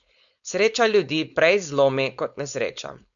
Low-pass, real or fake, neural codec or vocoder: 7.2 kHz; fake; codec, 16 kHz, 4.8 kbps, FACodec